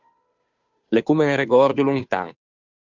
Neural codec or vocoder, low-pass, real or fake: codec, 16 kHz, 2 kbps, FunCodec, trained on Chinese and English, 25 frames a second; 7.2 kHz; fake